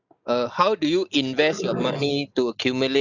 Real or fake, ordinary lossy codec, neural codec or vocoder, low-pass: real; none; none; 7.2 kHz